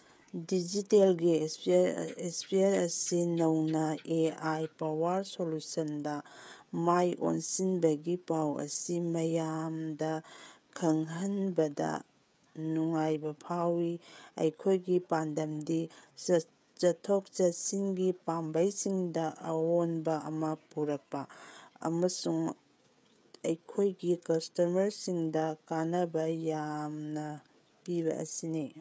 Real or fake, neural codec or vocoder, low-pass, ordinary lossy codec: fake; codec, 16 kHz, 16 kbps, FreqCodec, smaller model; none; none